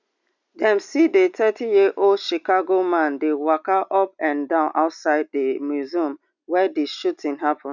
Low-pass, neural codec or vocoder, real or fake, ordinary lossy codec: 7.2 kHz; none; real; none